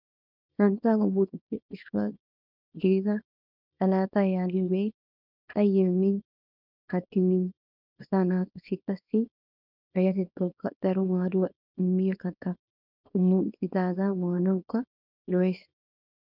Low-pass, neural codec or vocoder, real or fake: 5.4 kHz; codec, 24 kHz, 0.9 kbps, WavTokenizer, small release; fake